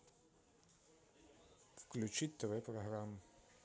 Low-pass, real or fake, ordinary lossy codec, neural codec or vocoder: none; real; none; none